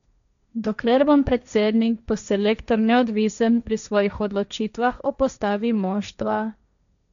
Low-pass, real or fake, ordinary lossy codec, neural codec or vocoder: 7.2 kHz; fake; none; codec, 16 kHz, 1.1 kbps, Voila-Tokenizer